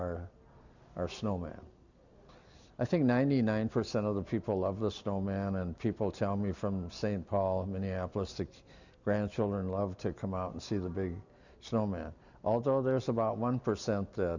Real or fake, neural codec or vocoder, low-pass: real; none; 7.2 kHz